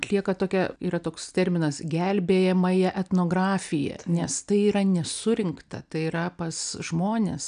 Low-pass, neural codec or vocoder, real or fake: 9.9 kHz; none; real